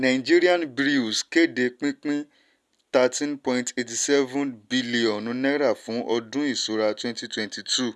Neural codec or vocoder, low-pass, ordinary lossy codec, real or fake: none; none; none; real